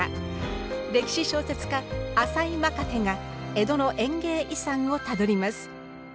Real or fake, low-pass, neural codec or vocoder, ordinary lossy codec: real; none; none; none